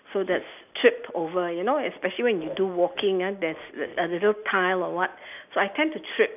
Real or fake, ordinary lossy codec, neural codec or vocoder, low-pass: real; none; none; 3.6 kHz